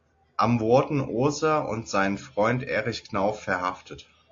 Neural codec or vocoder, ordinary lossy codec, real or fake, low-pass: none; AAC, 48 kbps; real; 7.2 kHz